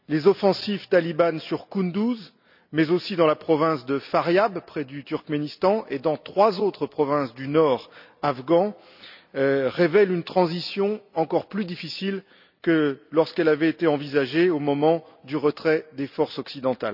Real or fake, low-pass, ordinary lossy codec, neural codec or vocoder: real; 5.4 kHz; none; none